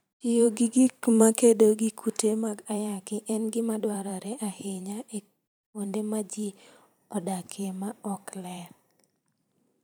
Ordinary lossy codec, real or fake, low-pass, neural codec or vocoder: none; fake; none; vocoder, 44.1 kHz, 128 mel bands every 512 samples, BigVGAN v2